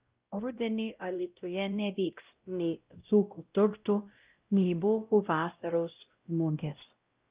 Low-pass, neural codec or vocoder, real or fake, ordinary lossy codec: 3.6 kHz; codec, 16 kHz, 0.5 kbps, X-Codec, WavLM features, trained on Multilingual LibriSpeech; fake; Opus, 24 kbps